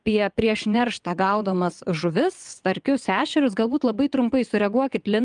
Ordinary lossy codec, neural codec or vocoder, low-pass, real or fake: Opus, 32 kbps; vocoder, 22.05 kHz, 80 mel bands, WaveNeXt; 9.9 kHz; fake